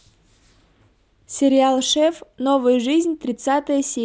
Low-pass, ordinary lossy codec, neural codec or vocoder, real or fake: none; none; none; real